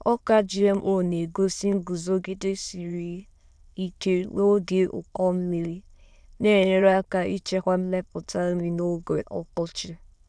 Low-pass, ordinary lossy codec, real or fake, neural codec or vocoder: none; none; fake; autoencoder, 22.05 kHz, a latent of 192 numbers a frame, VITS, trained on many speakers